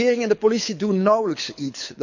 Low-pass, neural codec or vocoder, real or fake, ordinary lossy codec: 7.2 kHz; codec, 24 kHz, 6 kbps, HILCodec; fake; none